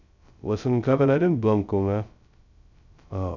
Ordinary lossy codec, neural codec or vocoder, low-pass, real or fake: none; codec, 16 kHz, 0.2 kbps, FocalCodec; 7.2 kHz; fake